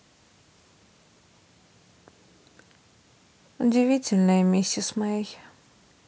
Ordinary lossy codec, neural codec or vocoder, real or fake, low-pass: none; none; real; none